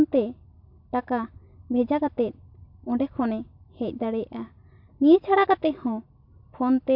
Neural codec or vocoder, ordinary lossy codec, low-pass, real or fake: none; MP3, 48 kbps; 5.4 kHz; real